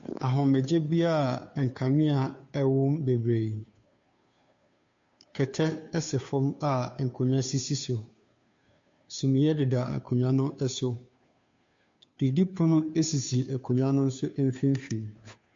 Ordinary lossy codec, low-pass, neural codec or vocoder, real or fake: MP3, 48 kbps; 7.2 kHz; codec, 16 kHz, 2 kbps, FunCodec, trained on Chinese and English, 25 frames a second; fake